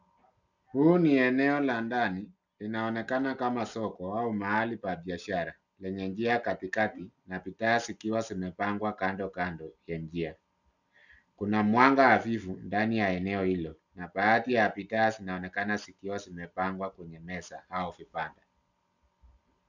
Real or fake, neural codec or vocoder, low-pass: real; none; 7.2 kHz